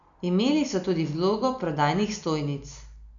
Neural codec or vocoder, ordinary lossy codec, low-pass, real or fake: none; none; 7.2 kHz; real